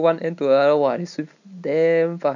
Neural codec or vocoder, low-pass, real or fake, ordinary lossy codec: none; 7.2 kHz; real; none